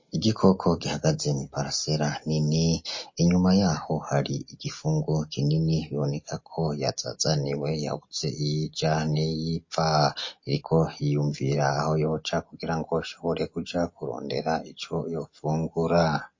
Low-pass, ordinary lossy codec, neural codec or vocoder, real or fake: 7.2 kHz; MP3, 32 kbps; none; real